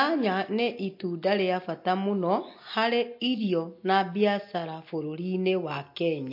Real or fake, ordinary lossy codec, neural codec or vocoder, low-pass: real; MP3, 32 kbps; none; 5.4 kHz